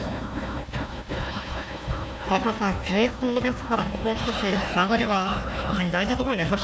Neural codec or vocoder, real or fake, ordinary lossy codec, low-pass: codec, 16 kHz, 1 kbps, FunCodec, trained on Chinese and English, 50 frames a second; fake; none; none